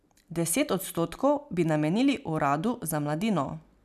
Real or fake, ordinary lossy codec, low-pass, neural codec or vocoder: real; none; 14.4 kHz; none